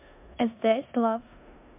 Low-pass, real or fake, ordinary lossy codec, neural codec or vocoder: 3.6 kHz; fake; MP3, 32 kbps; codec, 16 kHz in and 24 kHz out, 0.9 kbps, LongCat-Audio-Codec, four codebook decoder